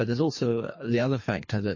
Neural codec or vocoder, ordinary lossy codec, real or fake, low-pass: codec, 24 kHz, 3 kbps, HILCodec; MP3, 32 kbps; fake; 7.2 kHz